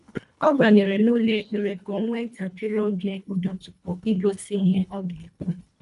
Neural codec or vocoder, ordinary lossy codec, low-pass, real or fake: codec, 24 kHz, 1.5 kbps, HILCodec; none; 10.8 kHz; fake